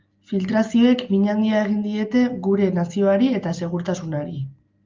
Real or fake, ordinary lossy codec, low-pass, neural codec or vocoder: real; Opus, 24 kbps; 7.2 kHz; none